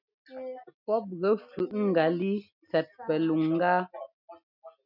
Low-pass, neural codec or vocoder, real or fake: 5.4 kHz; none; real